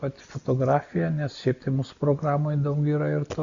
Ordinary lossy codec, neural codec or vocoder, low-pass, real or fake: Opus, 64 kbps; none; 7.2 kHz; real